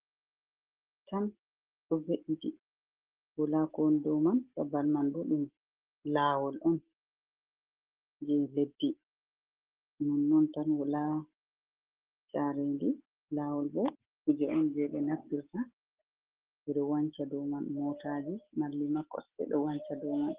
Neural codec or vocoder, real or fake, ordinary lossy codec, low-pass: none; real; Opus, 16 kbps; 3.6 kHz